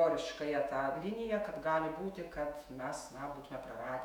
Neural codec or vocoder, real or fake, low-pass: autoencoder, 48 kHz, 128 numbers a frame, DAC-VAE, trained on Japanese speech; fake; 19.8 kHz